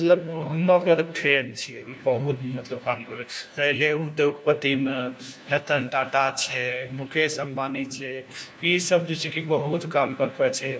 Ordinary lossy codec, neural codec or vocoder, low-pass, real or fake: none; codec, 16 kHz, 1 kbps, FunCodec, trained on LibriTTS, 50 frames a second; none; fake